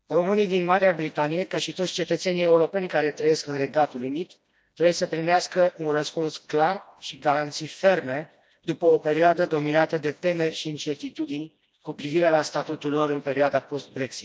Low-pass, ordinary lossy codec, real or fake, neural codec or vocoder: none; none; fake; codec, 16 kHz, 1 kbps, FreqCodec, smaller model